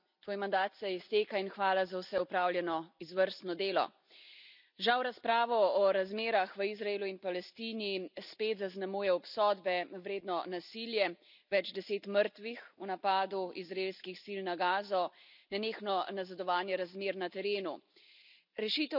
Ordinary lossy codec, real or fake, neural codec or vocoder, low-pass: none; real; none; 5.4 kHz